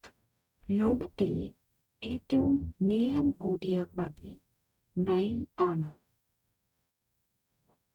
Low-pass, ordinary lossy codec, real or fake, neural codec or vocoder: 19.8 kHz; none; fake; codec, 44.1 kHz, 0.9 kbps, DAC